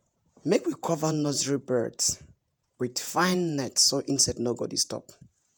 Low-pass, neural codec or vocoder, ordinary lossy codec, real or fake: none; vocoder, 48 kHz, 128 mel bands, Vocos; none; fake